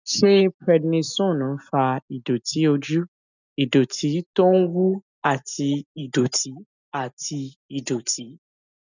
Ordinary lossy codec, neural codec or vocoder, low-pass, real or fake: none; none; 7.2 kHz; real